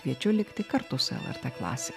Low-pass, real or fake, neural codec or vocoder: 14.4 kHz; real; none